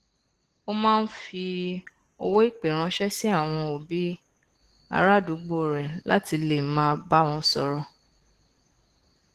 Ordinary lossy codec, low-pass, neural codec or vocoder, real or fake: Opus, 16 kbps; 14.4 kHz; codec, 44.1 kHz, 7.8 kbps, DAC; fake